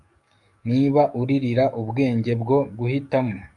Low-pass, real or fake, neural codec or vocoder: 10.8 kHz; fake; codec, 44.1 kHz, 7.8 kbps, DAC